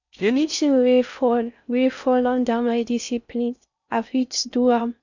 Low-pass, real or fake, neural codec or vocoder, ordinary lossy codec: 7.2 kHz; fake; codec, 16 kHz in and 24 kHz out, 0.6 kbps, FocalCodec, streaming, 4096 codes; none